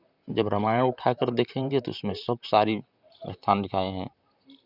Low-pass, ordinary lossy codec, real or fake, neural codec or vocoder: 5.4 kHz; none; fake; vocoder, 44.1 kHz, 128 mel bands, Pupu-Vocoder